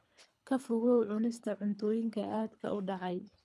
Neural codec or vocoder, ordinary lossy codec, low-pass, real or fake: codec, 24 kHz, 3 kbps, HILCodec; none; none; fake